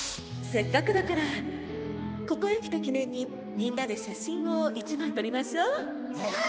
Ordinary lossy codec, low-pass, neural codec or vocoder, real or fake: none; none; codec, 16 kHz, 2 kbps, X-Codec, HuBERT features, trained on balanced general audio; fake